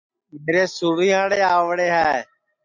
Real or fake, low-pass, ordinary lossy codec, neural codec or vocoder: real; 7.2 kHz; MP3, 64 kbps; none